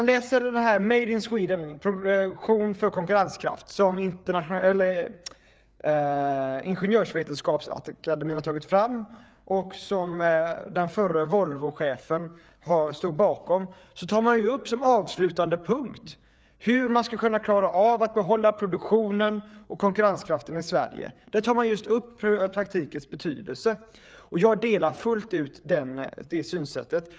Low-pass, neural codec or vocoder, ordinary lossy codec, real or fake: none; codec, 16 kHz, 4 kbps, FreqCodec, larger model; none; fake